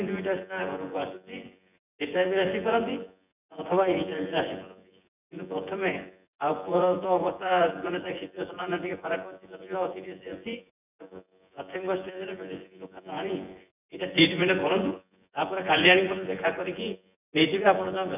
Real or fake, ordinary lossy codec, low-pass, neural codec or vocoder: fake; none; 3.6 kHz; vocoder, 24 kHz, 100 mel bands, Vocos